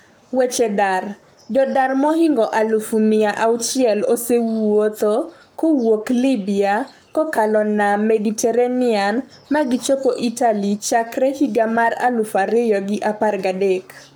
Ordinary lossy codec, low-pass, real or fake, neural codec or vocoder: none; none; fake; codec, 44.1 kHz, 7.8 kbps, Pupu-Codec